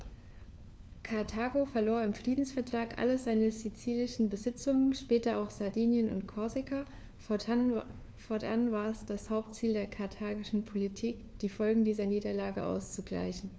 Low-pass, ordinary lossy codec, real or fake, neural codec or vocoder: none; none; fake; codec, 16 kHz, 4 kbps, FunCodec, trained on LibriTTS, 50 frames a second